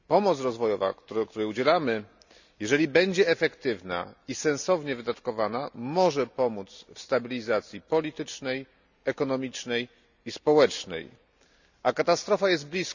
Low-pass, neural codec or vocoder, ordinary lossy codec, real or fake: 7.2 kHz; none; none; real